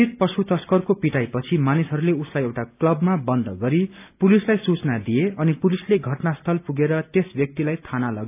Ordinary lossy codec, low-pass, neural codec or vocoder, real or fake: AAC, 32 kbps; 3.6 kHz; none; real